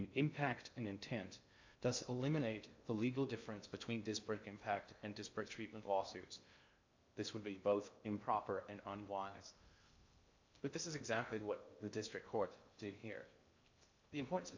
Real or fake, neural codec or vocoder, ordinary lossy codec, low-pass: fake; codec, 16 kHz in and 24 kHz out, 0.6 kbps, FocalCodec, streaming, 2048 codes; MP3, 64 kbps; 7.2 kHz